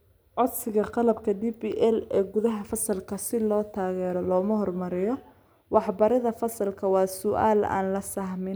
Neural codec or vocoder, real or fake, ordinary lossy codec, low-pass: none; real; none; none